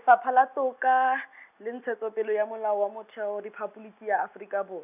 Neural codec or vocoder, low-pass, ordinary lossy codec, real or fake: none; 3.6 kHz; none; real